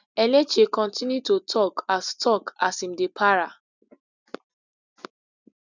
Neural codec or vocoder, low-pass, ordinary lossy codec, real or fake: none; 7.2 kHz; none; real